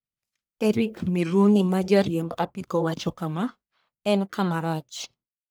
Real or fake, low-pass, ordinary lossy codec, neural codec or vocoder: fake; none; none; codec, 44.1 kHz, 1.7 kbps, Pupu-Codec